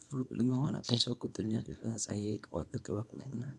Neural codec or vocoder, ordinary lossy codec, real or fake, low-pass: codec, 24 kHz, 0.9 kbps, WavTokenizer, small release; none; fake; none